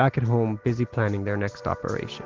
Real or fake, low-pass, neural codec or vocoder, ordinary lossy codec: real; 7.2 kHz; none; Opus, 16 kbps